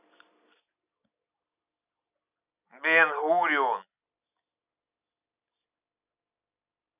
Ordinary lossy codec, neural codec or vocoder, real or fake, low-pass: none; none; real; 3.6 kHz